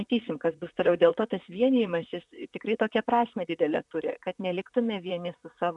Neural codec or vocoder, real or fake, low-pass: vocoder, 44.1 kHz, 128 mel bands, Pupu-Vocoder; fake; 10.8 kHz